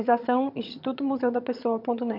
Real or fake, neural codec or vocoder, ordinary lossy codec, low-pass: fake; vocoder, 22.05 kHz, 80 mel bands, HiFi-GAN; MP3, 48 kbps; 5.4 kHz